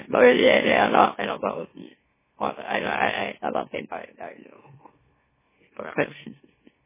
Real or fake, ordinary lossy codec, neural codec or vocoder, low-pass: fake; MP3, 16 kbps; autoencoder, 44.1 kHz, a latent of 192 numbers a frame, MeloTTS; 3.6 kHz